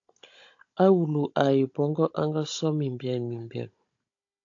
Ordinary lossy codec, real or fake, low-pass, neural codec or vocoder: AAC, 48 kbps; fake; 7.2 kHz; codec, 16 kHz, 16 kbps, FunCodec, trained on Chinese and English, 50 frames a second